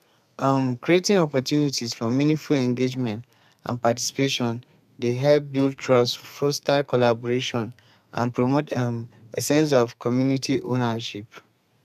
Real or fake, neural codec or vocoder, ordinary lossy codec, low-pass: fake; codec, 32 kHz, 1.9 kbps, SNAC; none; 14.4 kHz